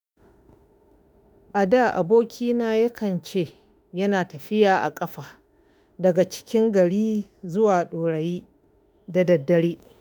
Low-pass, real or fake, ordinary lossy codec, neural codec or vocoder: none; fake; none; autoencoder, 48 kHz, 32 numbers a frame, DAC-VAE, trained on Japanese speech